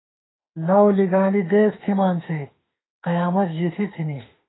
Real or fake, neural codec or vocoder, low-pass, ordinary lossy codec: fake; autoencoder, 48 kHz, 32 numbers a frame, DAC-VAE, trained on Japanese speech; 7.2 kHz; AAC, 16 kbps